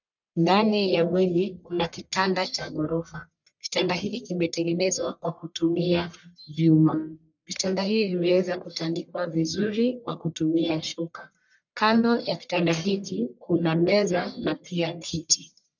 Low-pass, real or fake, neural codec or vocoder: 7.2 kHz; fake; codec, 44.1 kHz, 1.7 kbps, Pupu-Codec